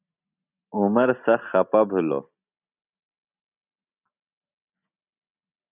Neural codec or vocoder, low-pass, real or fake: none; 3.6 kHz; real